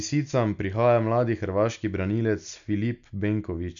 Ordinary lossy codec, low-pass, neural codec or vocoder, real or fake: none; 7.2 kHz; none; real